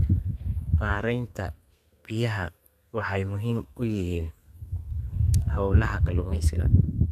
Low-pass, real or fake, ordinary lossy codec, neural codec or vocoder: 14.4 kHz; fake; none; codec, 32 kHz, 1.9 kbps, SNAC